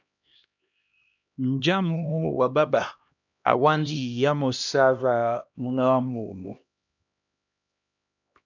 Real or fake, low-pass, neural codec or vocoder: fake; 7.2 kHz; codec, 16 kHz, 1 kbps, X-Codec, HuBERT features, trained on LibriSpeech